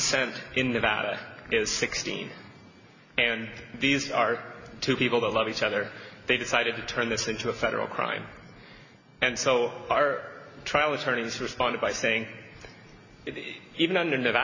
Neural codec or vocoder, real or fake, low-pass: none; real; 7.2 kHz